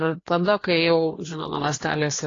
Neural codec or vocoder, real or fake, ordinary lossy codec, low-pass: codec, 16 kHz, 2 kbps, FreqCodec, larger model; fake; AAC, 32 kbps; 7.2 kHz